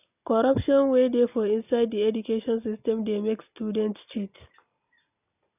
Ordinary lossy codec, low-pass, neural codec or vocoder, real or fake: none; 3.6 kHz; none; real